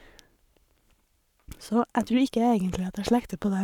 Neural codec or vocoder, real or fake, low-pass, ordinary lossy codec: codec, 44.1 kHz, 7.8 kbps, Pupu-Codec; fake; 19.8 kHz; none